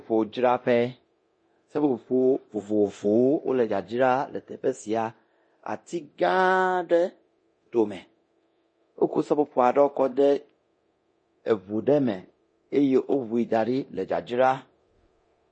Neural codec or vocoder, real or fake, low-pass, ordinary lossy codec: codec, 24 kHz, 0.9 kbps, DualCodec; fake; 9.9 kHz; MP3, 32 kbps